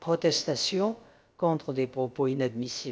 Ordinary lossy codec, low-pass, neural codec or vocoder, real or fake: none; none; codec, 16 kHz, about 1 kbps, DyCAST, with the encoder's durations; fake